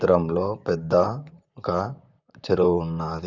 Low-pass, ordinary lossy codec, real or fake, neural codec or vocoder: 7.2 kHz; none; fake; vocoder, 44.1 kHz, 128 mel bands, Pupu-Vocoder